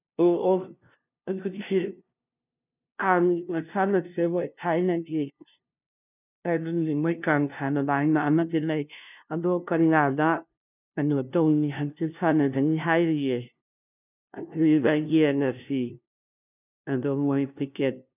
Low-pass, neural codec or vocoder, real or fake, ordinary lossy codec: 3.6 kHz; codec, 16 kHz, 0.5 kbps, FunCodec, trained on LibriTTS, 25 frames a second; fake; none